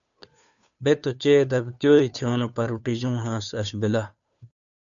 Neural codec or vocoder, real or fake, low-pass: codec, 16 kHz, 2 kbps, FunCodec, trained on Chinese and English, 25 frames a second; fake; 7.2 kHz